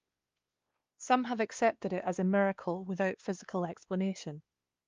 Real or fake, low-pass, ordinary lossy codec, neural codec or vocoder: fake; 7.2 kHz; Opus, 32 kbps; codec, 16 kHz, 2 kbps, X-Codec, WavLM features, trained on Multilingual LibriSpeech